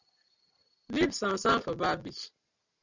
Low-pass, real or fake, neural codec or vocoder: 7.2 kHz; real; none